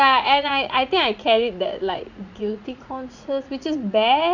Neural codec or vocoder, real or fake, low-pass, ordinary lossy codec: vocoder, 44.1 kHz, 80 mel bands, Vocos; fake; 7.2 kHz; none